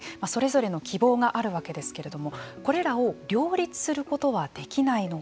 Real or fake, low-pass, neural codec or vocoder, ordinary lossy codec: real; none; none; none